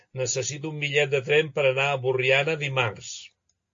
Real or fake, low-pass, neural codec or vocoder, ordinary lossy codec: real; 7.2 kHz; none; AAC, 48 kbps